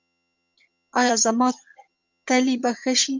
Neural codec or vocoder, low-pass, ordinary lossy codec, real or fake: vocoder, 22.05 kHz, 80 mel bands, HiFi-GAN; 7.2 kHz; MP3, 64 kbps; fake